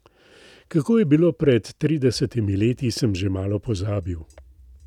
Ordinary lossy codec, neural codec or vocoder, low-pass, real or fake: none; none; 19.8 kHz; real